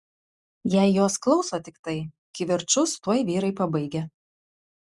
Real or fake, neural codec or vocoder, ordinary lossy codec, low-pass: real; none; Opus, 64 kbps; 10.8 kHz